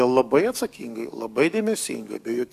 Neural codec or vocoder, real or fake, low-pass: codec, 44.1 kHz, 7.8 kbps, DAC; fake; 14.4 kHz